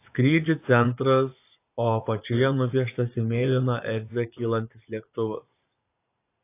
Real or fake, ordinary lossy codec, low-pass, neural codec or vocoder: fake; AAC, 24 kbps; 3.6 kHz; vocoder, 44.1 kHz, 80 mel bands, Vocos